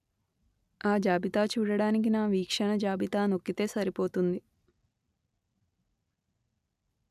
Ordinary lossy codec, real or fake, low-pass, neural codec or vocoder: none; real; 14.4 kHz; none